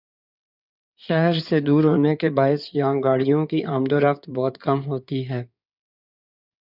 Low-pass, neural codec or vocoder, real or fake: 5.4 kHz; codec, 16 kHz in and 24 kHz out, 2.2 kbps, FireRedTTS-2 codec; fake